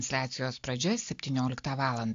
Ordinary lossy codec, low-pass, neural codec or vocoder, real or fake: AAC, 48 kbps; 7.2 kHz; none; real